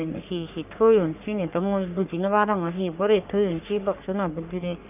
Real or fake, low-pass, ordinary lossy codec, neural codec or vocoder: fake; 3.6 kHz; none; codec, 44.1 kHz, 3.4 kbps, Pupu-Codec